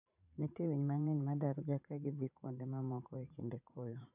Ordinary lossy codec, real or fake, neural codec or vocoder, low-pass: none; fake; vocoder, 44.1 kHz, 80 mel bands, Vocos; 3.6 kHz